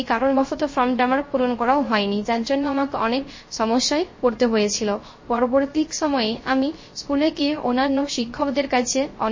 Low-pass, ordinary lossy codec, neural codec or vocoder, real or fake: 7.2 kHz; MP3, 32 kbps; codec, 16 kHz, 0.3 kbps, FocalCodec; fake